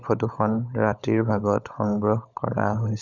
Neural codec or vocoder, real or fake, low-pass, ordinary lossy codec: codec, 16 kHz, 16 kbps, FunCodec, trained on LibriTTS, 50 frames a second; fake; 7.2 kHz; none